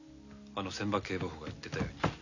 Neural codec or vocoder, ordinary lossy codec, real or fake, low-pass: none; none; real; 7.2 kHz